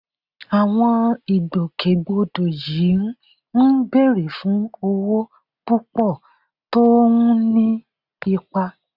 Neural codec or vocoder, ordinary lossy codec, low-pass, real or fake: none; none; 5.4 kHz; real